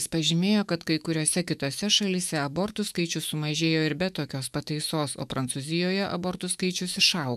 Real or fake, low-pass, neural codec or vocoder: fake; 14.4 kHz; autoencoder, 48 kHz, 128 numbers a frame, DAC-VAE, trained on Japanese speech